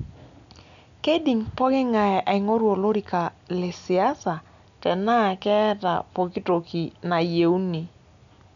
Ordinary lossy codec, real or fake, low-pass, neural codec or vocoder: none; real; 7.2 kHz; none